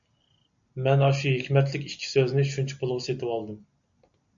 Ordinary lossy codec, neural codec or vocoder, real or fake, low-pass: MP3, 64 kbps; none; real; 7.2 kHz